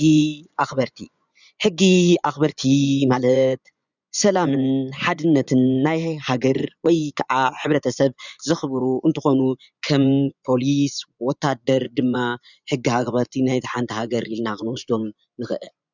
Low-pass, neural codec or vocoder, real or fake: 7.2 kHz; vocoder, 24 kHz, 100 mel bands, Vocos; fake